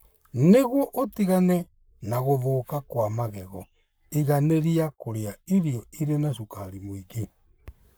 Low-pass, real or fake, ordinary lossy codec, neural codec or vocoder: none; fake; none; codec, 44.1 kHz, 7.8 kbps, Pupu-Codec